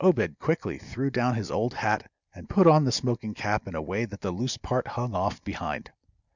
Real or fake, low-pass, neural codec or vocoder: real; 7.2 kHz; none